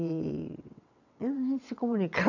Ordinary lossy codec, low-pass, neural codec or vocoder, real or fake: none; 7.2 kHz; vocoder, 22.05 kHz, 80 mel bands, WaveNeXt; fake